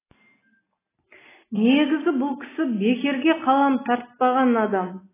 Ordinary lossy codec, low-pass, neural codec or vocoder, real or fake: AAC, 16 kbps; 3.6 kHz; none; real